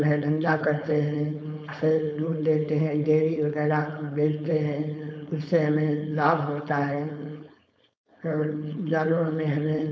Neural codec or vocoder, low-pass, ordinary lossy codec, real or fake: codec, 16 kHz, 4.8 kbps, FACodec; none; none; fake